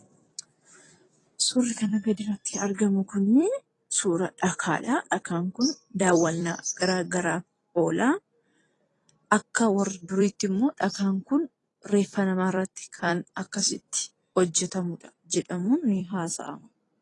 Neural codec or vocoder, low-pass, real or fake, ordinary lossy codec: vocoder, 44.1 kHz, 128 mel bands every 256 samples, BigVGAN v2; 10.8 kHz; fake; AAC, 32 kbps